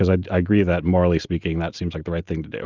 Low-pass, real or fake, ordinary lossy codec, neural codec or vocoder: 7.2 kHz; real; Opus, 24 kbps; none